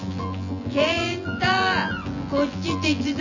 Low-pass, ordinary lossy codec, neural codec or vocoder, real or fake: 7.2 kHz; none; vocoder, 24 kHz, 100 mel bands, Vocos; fake